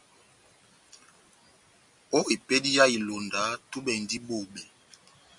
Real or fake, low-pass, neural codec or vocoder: real; 10.8 kHz; none